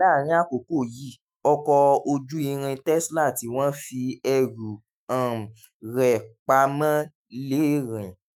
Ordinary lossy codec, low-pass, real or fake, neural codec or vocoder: none; none; fake; autoencoder, 48 kHz, 128 numbers a frame, DAC-VAE, trained on Japanese speech